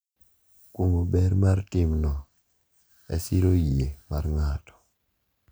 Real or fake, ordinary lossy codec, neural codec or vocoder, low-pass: real; none; none; none